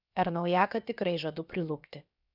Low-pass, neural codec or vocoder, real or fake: 5.4 kHz; codec, 16 kHz, about 1 kbps, DyCAST, with the encoder's durations; fake